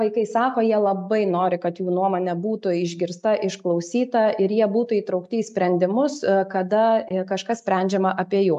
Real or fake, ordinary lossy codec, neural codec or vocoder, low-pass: real; AAC, 96 kbps; none; 10.8 kHz